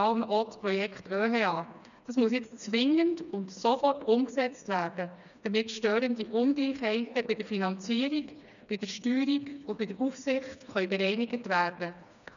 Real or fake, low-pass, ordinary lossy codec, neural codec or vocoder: fake; 7.2 kHz; none; codec, 16 kHz, 2 kbps, FreqCodec, smaller model